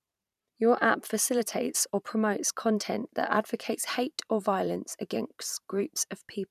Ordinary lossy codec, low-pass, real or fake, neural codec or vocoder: none; 14.4 kHz; fake; vocoder, 44.1 kHz, 128 mel bands every 512 samples, BigVGAN v2